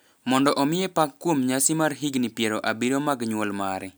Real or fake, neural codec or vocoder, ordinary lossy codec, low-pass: real; none; none; none